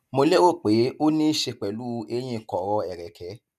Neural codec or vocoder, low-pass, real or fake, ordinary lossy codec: none; 14.4 kHz; real; none